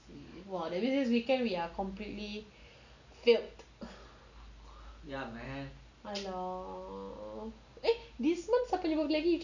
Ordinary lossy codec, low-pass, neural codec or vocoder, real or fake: none; 7.2 kHz; none; real